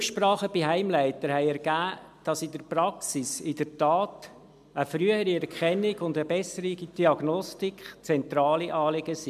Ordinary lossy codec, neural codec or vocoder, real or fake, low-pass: MP3, 96 kbps; none; real; 14.4 kHz